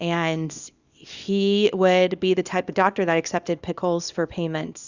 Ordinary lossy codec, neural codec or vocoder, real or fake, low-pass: Opus, 64 kbps; codec, 24 kHz, 0.9 kbps, WavTokenizer, small release; fake; 7.2 kHz